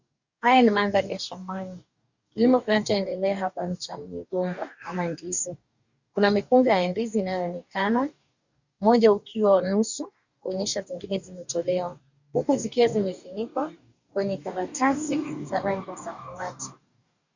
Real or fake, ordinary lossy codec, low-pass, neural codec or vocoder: fake; Opus, 64 kbps; 7.2 kHz; codec, 44.1 kHz, 2.6 kbps, DAC